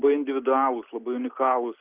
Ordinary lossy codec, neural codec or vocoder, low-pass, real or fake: Opus, 24 kbps; none; 3.6 kHz; real